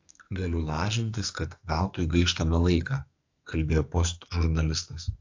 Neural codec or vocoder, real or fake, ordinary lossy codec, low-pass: codec, 44.1 kHz, 2.6 kbps, SNAC; fake; AAC, 48 kbps; 7.2 kHz